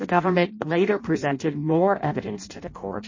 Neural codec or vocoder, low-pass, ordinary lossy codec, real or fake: codec, 16 kHz in and 24 kHz out, 0.6 kbps, FireRedTTS-2 codec; 7.2 kHz; MP3, 32 kbps; fake